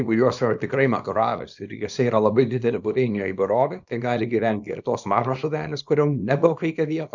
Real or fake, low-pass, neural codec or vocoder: fake; 7.2 kHz; codec, 24 kHz, 0.9 kbps, WavTokenizer, small release